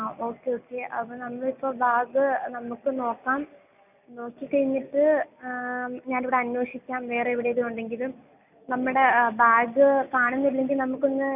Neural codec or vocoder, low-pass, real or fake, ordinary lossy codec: none; 3.6 kHz; real; none